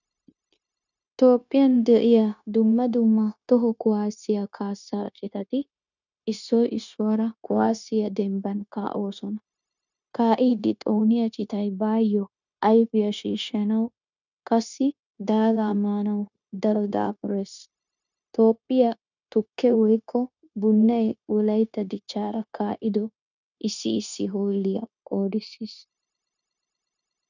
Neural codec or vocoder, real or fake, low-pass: codec, 16 kHz, 0.9 kbps, LongCat-Audio-Codec; fake; 7.2 kHz